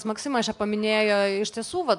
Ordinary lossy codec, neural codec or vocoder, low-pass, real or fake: MP3, 96 kbps; none; 10.8 kHz; real